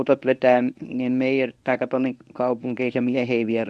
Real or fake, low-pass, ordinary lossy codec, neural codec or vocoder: fake; none; none; codec, 24 kHz, 0.9 kbps, WavTokenizer, medium speech release version 1